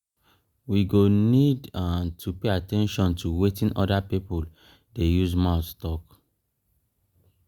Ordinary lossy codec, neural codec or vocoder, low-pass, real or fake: Opus, 64 kbps; none; 19.8 kHz; real